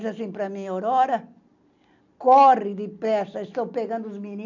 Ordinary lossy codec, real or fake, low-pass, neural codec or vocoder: none; real; 7.2 kHz; none